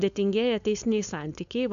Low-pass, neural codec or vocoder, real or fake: 7.2 kHz; codec, 16 kHz, 4.8 kbps, FACodec; fake